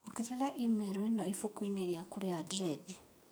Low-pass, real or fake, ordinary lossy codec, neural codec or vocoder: none; fake; none; codec, 44.1 kHz, 2.6 kbps, SNAC